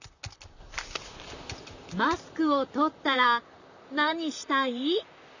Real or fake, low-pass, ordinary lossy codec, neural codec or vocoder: fake; 7.2 kHz; none; vocoder, 44.1 kHz, 128 mel bands, Pupu-Vocoder